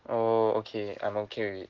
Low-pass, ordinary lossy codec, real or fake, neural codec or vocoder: 7.2 kHz; Opus, 16 kbps; real; none